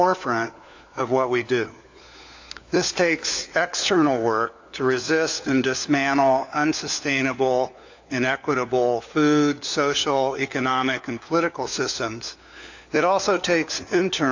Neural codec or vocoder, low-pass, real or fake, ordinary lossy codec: codec, 16 kHz, 4 kbps, FunCodec, trained on LibriTTS, 50 frames a second; 7.2 kHz; fake; AAC, 48 kbps